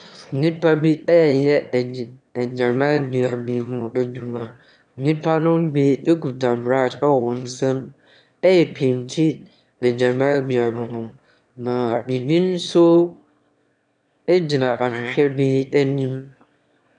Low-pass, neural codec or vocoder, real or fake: 9.9 kHz; autoencoder, 22.05 kHz, a latent of 192 numbers a frame, VITS, trained on one speaker; fake